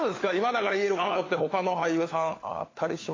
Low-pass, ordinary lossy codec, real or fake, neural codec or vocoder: 7.2 kHz; AAC, 32 kbps; fake; codec, 16 kHz, 4 kbps, FunCodec, trained on LibriTTS, 50 frames a second